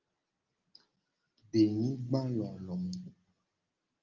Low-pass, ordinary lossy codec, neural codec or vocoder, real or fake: 7.2 kHz; Opus, 32 kbps; none; real